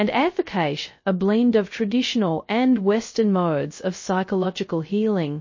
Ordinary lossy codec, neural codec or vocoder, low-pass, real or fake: MP3, 32 kbps; codec, 16 kHz, 0.2 kbps, FocalCodec; 7.2 kHz; fake